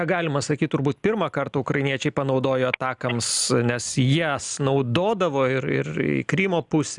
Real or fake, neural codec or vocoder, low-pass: real; none; 10.8 kHz